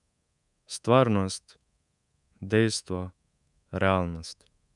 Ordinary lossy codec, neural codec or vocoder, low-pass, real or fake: none; codec, 24 kHz, 3.1 kbps, DualCodec; 10.8 kHz; fake